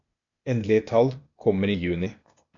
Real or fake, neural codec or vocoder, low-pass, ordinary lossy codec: fake; codec, 16 kHz, 0.8 kbps, ZipCodec; 7.2 kHz; MP3, 64 kbps